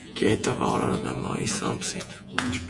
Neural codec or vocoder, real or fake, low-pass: vocoder, 48 kHz, 128 mel bands, Vocos; fake; 10.8 kHz